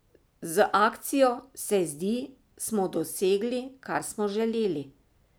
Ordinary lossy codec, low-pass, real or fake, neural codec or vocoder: none; none; real; none